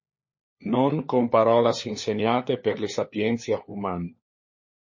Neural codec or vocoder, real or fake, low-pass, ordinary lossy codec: codec, 16 kHz, 4 kbps, FunCodec, trained on LibriTTS, 50 frames a second; fake; 7.2 kHz; MP3, 32 kbps